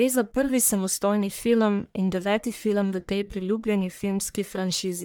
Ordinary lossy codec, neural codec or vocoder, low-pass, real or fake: none; codec, 44.1 kHz, 1.7 kbps, Pupu-Codec; none; fake